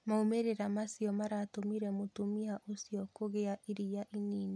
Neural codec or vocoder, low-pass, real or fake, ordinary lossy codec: none; none; real; none